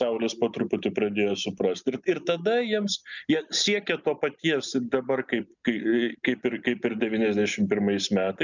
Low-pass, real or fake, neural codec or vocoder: 7.2 kHz; real; none